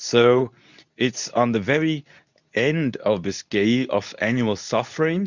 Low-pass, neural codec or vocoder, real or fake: 7.2 kHz; codec, 24 kHz, 0.9 kbps, WavTokenizer, medium speech release version 2; fake